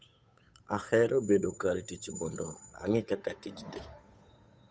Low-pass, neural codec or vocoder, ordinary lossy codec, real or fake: none; codec, 16 kHz, 8 kbps, FunCodec, trained on Chinese and English, 25 frames a second; none; fake